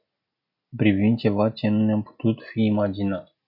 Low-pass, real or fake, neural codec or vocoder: 5.4 kHz; real; none